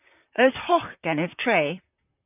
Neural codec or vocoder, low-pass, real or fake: vocoder, 44.1 kHz, 128 mel bands, Pupu-Vocoder; 3.6 kHz; fake